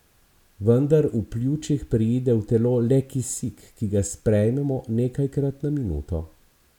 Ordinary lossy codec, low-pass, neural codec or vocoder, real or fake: none; 19.8 kHz; none; real